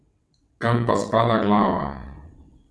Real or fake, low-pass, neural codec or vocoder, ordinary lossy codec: fake; none; vocoder, 22.05 kHz, 80 mel bands, WaveNeXt; none